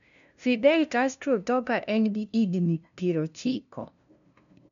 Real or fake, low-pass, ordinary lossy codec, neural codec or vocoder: fake; 7.2 kHz; none; codec, 16 kHz, 0.5 kbps, FunCodec, trained on LibriTTS, 25 frames a second